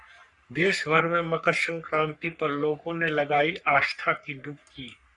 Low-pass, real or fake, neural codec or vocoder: 10.8 kHz; fake; codec, 44.1 kHz, 3.4 kbps, Pupu-Codec